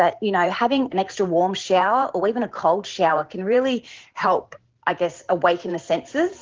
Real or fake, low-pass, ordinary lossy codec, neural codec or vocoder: fake; 7.2 kHz; Opus, 16 kbps; vocoder, 44.1 kHz, 128 mel bands every 512 samples, BigVGAN v2